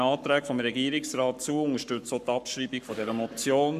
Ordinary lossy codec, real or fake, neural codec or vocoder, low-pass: none; fake; codec, 44.1 kHz, 7.8 kbps, Pupu-Codec; 14.4 kHz